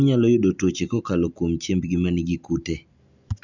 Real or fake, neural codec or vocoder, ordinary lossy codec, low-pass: real; none; none; 7.2 kHz